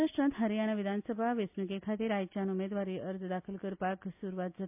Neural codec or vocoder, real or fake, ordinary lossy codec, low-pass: none; real; MP3, 32 kbps; 3.6 kHz